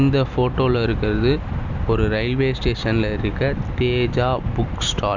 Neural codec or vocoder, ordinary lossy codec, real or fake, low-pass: none; none; real; 7.2 kHz